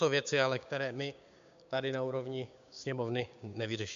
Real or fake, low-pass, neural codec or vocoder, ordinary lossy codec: fake; 7.2 kHz; codec, 16 kHz, 6 kbps, DAC; MP3, 64 kbps